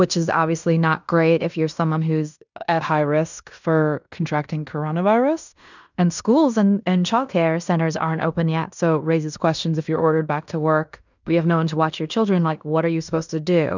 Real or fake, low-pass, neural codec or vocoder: fake; 7.2 kHz; codec, 16 kHz in and 24 kHz out, 0.9 kbps, LongCat-Audio-Codec, fine tuned four codebook decoder